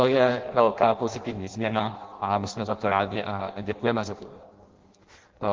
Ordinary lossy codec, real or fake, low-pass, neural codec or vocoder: Opus, 16 kbps; fake; 7.2 kHz; codec, 16 kHz in and 24 kHz out, 0.6 kbps, FireRedTTS-2 codec